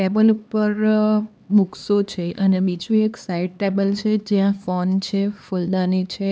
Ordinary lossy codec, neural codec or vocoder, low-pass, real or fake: none; codec, 16 kHz, 2 kbps, X-Codec, HuBERT features, trained on LibriSpeech; none; fake